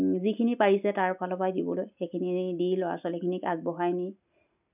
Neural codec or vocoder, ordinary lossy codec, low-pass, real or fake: none; none; 3.6 kHz; real